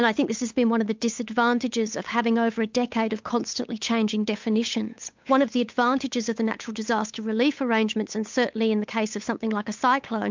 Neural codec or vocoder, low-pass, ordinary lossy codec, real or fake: codec, 16 kHz, 8 kbps, FunCodec, trained on Chinese and English, 25 frames a second; 7.2 kHz; MP3, 64 kbps; fake